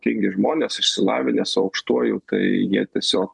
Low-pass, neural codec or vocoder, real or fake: 10.8 kHz; none; real